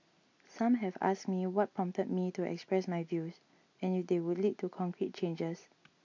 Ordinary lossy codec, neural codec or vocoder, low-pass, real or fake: MP3, 48 kbps; none; 7.2 kHz; real